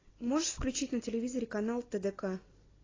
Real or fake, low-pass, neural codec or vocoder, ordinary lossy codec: real; 7.2 kHz; none; AAC, 32 kbps